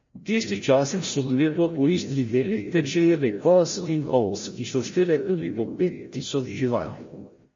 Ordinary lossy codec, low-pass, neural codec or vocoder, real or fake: MP3, 32 kbps; 7.2 kHz; codec, 16 kHz, 0.5 kbps, FreqCodec, larger model; fake